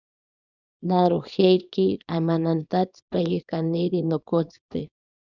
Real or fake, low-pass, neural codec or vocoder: fake; 7.2 kHz; codec, 24 kHz, 0.9 kbps, WavTokenizer, small release